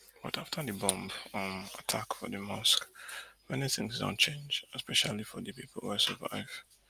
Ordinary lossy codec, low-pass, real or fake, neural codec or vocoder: Opus, 32 kbps; 14.4 kHz; real; none